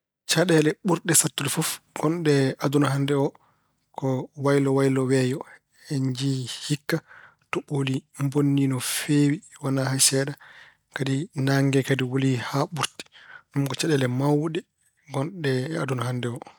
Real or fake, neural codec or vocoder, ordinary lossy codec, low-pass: real; none; none; none